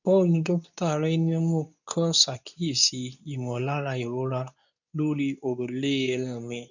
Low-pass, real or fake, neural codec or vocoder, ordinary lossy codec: 7.2 kHz; fake; codec, 24 kHz, 0.9 kbps, WavTokenizer, medium speech release version 2; none